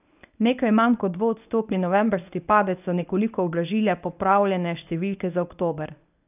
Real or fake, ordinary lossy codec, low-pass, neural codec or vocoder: fake; none; 3.6 kHz; codec, 24 kHz, 0.9 kbps, WavTokenizer, medium speech release version 1